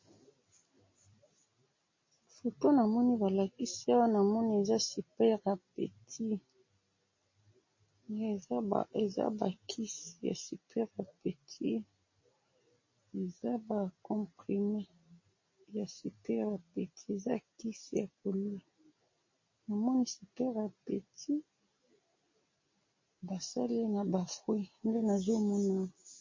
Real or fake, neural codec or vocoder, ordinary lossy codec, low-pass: real; none; MP3, 32 kbps; 7.2 kHz